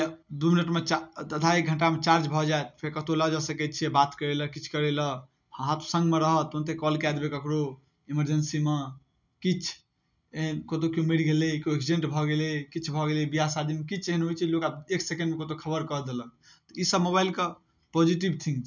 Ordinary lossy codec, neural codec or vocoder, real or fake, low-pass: none; none; real; 7.2 kHz